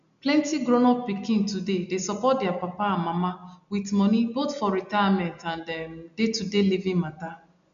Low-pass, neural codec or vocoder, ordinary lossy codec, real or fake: 7.2 kHz; none; MP3, 96 kbps; real